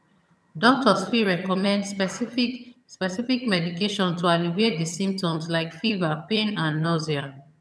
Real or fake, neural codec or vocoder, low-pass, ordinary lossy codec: fake; vocoder, 22.05 kHz, 80 mel bands, HiFi-GAN; none; none